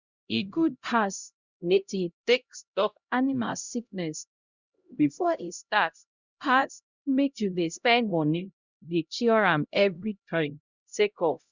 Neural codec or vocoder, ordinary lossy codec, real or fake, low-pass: codec, 16 kHz, 0.5 kbps, X-Codec, HuBERT features, trained on LibriSpeech; Opus, 64 kbps; fake; 7.2 kHz